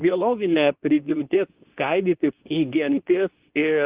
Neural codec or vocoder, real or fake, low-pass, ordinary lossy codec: codec, 24 kHz, 0.9 kbps, WavTokenizer, medium speech release version 2; fake; 3.6 kHz; Opus, 32 kbps